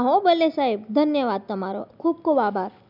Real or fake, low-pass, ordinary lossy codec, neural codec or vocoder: real; 5.4 kHz; none; none